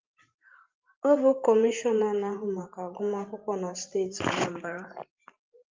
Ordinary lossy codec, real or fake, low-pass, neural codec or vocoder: Opus, 24 kbps; fake; 7.2 kHz; vocoder, 44.1 kHz, 128 mel bands every 512 samples, BigVGAN v2